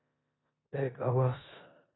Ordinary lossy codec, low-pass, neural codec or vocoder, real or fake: AAC, 16 kbps; 7.2 kHz; codec, 16 kHz in and 24 kHz out, 0.9 kbps, LongCat-Audio-Codec, four codebook decoder; fake